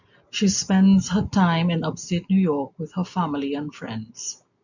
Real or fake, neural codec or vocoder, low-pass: real; none; 7.2 kHz